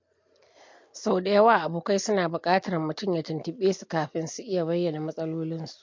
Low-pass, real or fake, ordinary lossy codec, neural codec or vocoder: 7.2 kHz; real; MP3, 48 kbps; none